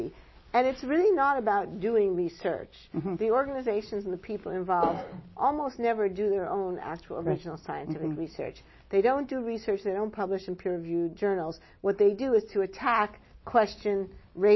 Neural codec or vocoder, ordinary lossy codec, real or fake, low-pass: none; MP3, 24 kbps; real; 7.2 kHz